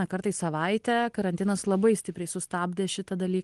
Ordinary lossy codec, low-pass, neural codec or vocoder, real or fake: Opus, 24 kbps; 10.8 kHz; none; real